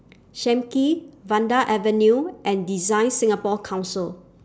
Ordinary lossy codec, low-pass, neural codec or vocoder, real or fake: none; none; none; real